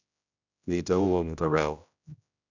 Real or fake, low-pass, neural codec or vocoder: fake; 7.2 kHz; codec, 16 kHz, 0.5 kbps, X-Codec, HuBERT features, trained on general audio